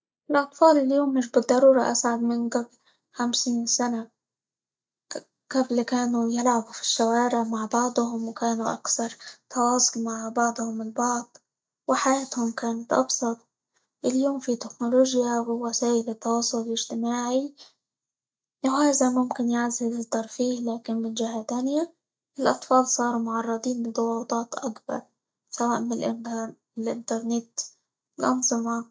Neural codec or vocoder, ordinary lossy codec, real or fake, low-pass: none; none; real; none